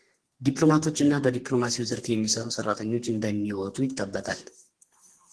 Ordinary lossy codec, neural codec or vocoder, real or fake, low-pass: Opus, 16 kbps; codec, 32 kHz, 1.9 kbps, SNAC; fake; 10.8 kHz